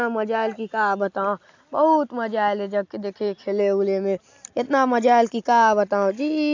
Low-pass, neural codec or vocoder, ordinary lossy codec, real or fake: 7.2 kHz; none; AAC, 48 kbps; real